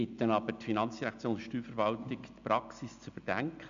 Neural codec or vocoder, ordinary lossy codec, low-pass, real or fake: none; MP3, 64 kbps; 7.2 kHz; real